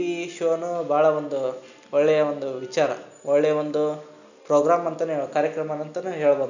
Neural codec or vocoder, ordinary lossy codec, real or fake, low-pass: none; none; real; 7.2 kHz